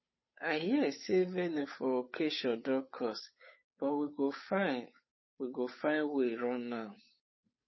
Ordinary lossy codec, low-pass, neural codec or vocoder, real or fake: MP3, 24 kbps; 7.2 kHz; codec, 16 kHz, 8 kbps, FunCodec, trained on Chinese and English, 25 frames a second; fake